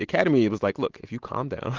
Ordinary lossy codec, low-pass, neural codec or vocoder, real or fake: Opus, 16 kbps; 7.2 kHz; none; real